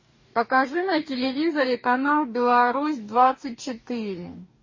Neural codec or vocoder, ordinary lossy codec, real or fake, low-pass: codec, 44.1 kHz, 2.6 kbps, DAC; MP3, 32 kbps; fake; 7.2 kHz